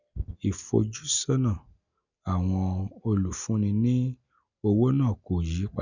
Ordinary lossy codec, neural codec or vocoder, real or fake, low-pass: none; none; real; 7.2 kHz